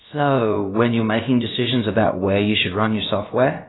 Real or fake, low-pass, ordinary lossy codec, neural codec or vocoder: fake; 7.2 kHz; AAC, 16 kbps; codec, 16 kHz, about 1 kbps, DyCAST, with the encoder's durations